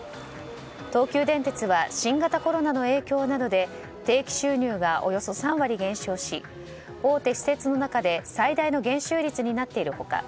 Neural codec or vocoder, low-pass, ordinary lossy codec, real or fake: none; none; none; real